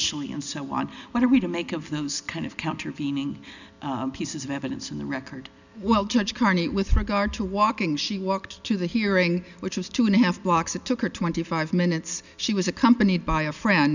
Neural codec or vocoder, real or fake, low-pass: none; real; 7.2 kHz